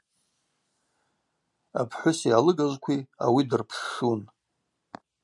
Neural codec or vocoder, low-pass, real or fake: none; 10.8 kHz; real